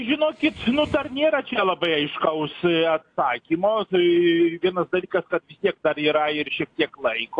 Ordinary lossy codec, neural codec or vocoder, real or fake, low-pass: AAC, 48 kbps; none; real; 9.9 kHz